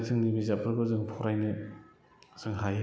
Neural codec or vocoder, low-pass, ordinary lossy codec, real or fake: none; none; none; real